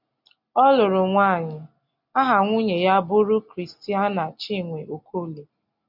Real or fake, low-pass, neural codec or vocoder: real; 5.4 kHz; none